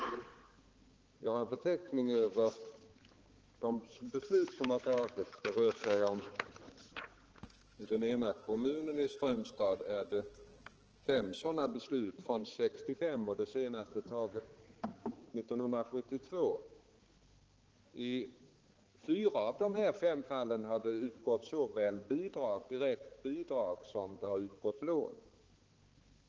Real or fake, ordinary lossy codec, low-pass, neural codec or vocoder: fake; Opus, 16 kbps; 7.2 kHz; codec, 16 kHz, 4 kbps, X-Codec, HuBERT features, trained on balanced general audio